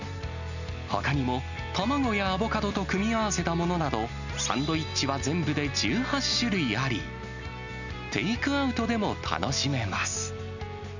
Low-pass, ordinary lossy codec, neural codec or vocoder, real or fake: 7.2 kHz; none; none; real